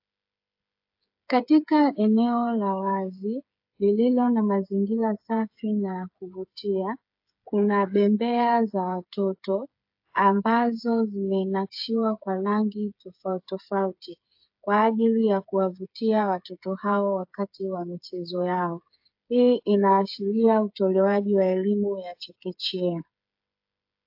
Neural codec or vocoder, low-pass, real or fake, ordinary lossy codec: codec, 16 kHz, 8 kbps, FreqCodec, smaller model; 5.4 kHz; fake; AAC, 48 kbps